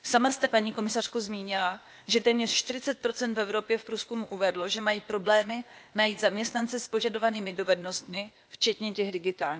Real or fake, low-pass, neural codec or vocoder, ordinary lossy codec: fake; none; codec, 16 kHz, 0.8 kbps, ZipCodec; none